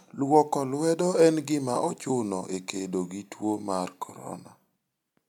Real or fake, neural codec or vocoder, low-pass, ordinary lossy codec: real; none; 19.8 kHz; none